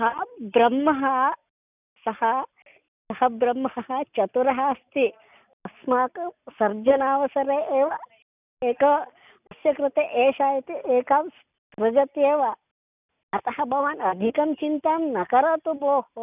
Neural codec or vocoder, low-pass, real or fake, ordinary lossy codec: none; 3.6 kHz; real; none